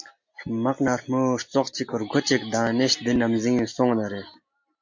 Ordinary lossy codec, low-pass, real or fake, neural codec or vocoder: MP3, 48 kbps; 7.2 kHz; real; none